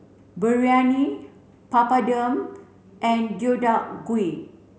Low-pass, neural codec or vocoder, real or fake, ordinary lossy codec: none; none; real; none